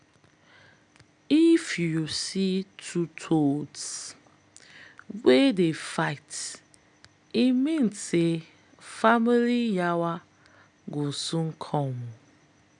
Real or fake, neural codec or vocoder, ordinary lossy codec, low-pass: real; none; none; 9.9 kHz